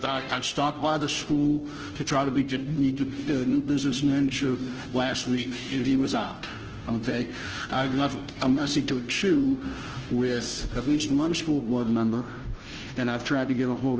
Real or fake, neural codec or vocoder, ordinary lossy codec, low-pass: fake; codec, 16 kHz, 0.5 kbps, FunCodec, trained on Chinese and English, 25 frames a second; Opus, 16 kbps; 7.2 kHz